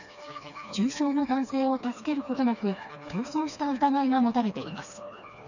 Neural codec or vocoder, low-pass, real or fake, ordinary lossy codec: codec, 16 kHz, 2 kbps, FreqCodec, smaller model; 7.2 kHz; fake; none